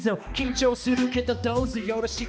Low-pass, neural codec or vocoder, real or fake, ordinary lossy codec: none; codec, 16 kHz, 2 kbps, X-Codec, HuBERT features, trained on balanced general audio; fake; none